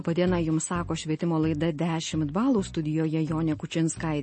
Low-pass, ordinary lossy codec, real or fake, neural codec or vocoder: 10.8 kHz; MP3, 32 kbps; real; none